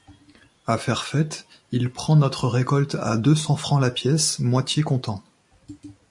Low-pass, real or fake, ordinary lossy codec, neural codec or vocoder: 10.8 kHz; real; MP3, 48 kbps; none